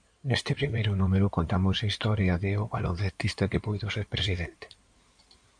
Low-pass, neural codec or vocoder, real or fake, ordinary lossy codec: 9.9 kHz; codec, 16 kHz in and 24 kHz out, 2.2 kbps, FireRedTTS-2 codec; fake; MP3, 64 kbps